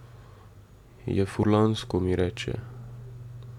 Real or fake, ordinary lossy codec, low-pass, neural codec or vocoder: real; none; 19.8 kHz; none